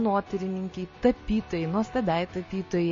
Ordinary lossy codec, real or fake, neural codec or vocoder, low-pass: MP3, 32 kbps; real; none; 7.2 kHz